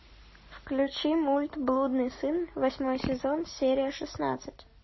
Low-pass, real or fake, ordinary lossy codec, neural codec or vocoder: 7.2 kHz; real; MP3, 24 kbps; none